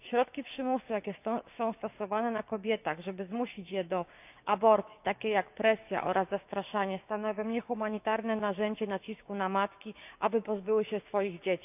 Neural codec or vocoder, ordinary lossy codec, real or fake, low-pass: codec, 16 kHz, 6 kbps, DAC; none; fake; 3.6 kHz